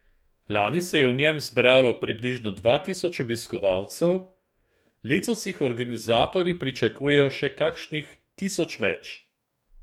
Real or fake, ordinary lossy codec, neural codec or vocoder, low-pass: fake; MP3, 96 kbps; codec, 44.1 kHz, 2.6 kbps, DAC; 19.8 kHz